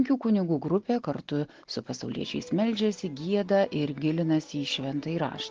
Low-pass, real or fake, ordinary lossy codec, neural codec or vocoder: 7.2 kHz; real; Opus, 16 kbps; none